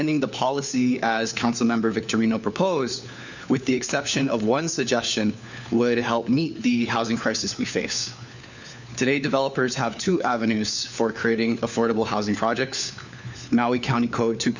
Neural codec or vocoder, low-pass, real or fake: codec, 16 kHz, 4 kbps, FunCodec, trained on LibriTTS, 50 frames a second; 7.2 kHz; fake